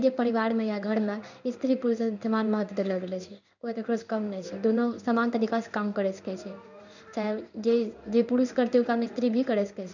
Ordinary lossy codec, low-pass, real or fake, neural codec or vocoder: none; 7.2 kHz; fake; codec, 16 kHz in and 24 kHz out, 1 kbps, XY-Tokenizer